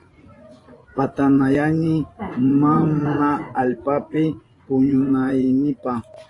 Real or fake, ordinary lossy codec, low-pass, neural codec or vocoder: fake; AAC, 32 kbps; 10.8 kHz; vocoder, 24 kHz, 100 mel bands, Vocos